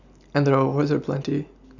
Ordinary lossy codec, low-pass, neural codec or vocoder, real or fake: none; 7.2 kHz; none; real